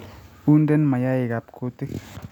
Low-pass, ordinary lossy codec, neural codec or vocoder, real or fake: 19.8 kHz; none; none; real